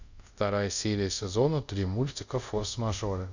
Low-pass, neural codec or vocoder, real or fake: 7.2 kHz; codec, 24 kHz, 0.5 kbps, DualCodec; fake